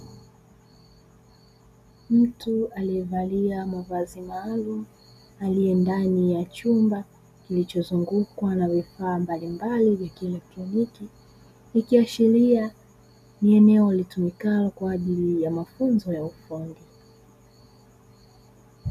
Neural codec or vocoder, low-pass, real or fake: none; 14.4 kHz; real